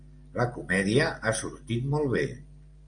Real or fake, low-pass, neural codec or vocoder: real; 9.9 kHz; none